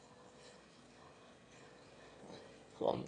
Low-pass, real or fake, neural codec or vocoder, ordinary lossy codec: 9.9 kHz; fake; autoencoder, 22.05 kHz, a latent of 192 numbers a frame, VITS, trained on one speaker; AAC, 32 kbps